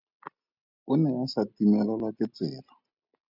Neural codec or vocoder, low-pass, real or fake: none; 5.4 kHz; real